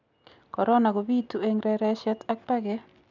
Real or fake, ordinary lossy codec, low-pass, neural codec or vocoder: real; none; 7.2 kHz; none